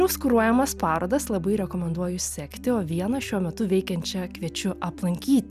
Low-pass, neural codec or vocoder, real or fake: 14.4 kHz; none; real